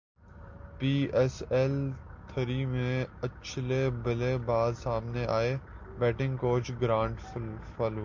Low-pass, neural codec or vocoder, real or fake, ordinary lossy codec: 7.2 kHz; none; real; MP3, 64 kbps